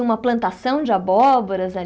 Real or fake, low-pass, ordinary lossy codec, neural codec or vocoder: real; none; none; none